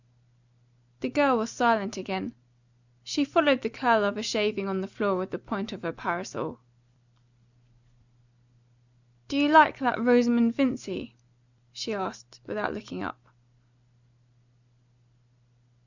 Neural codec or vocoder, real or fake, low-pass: none; real; 7.2 kHz